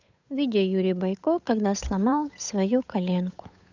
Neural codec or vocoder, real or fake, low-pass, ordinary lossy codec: codec, 16 kHz, 8 kbps, FunCodec, trained on Chinese and English, 25 frames a second; fake; 7.2 kHz; none